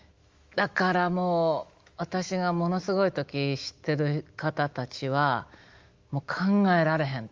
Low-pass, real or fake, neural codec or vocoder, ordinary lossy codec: 7.2 kHz; fake; autoencoder, 48 kHz, 128 numbers a frame, DAC-VAE, trained on Japanese speech; Opus, 32 kbps